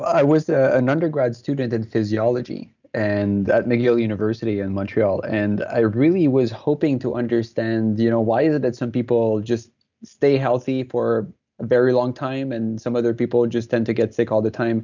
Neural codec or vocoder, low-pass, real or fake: none; 7.2 kHz; real